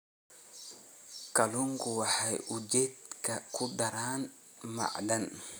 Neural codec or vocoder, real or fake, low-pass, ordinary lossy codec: none; real; none; none